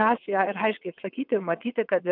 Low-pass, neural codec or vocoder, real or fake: 5.4 kHz; vocoder, 22.05 kHz, 80 mel bands, WaveNeXt; fake